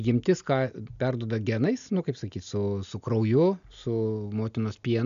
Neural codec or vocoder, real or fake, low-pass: none; real; 7.2 kHz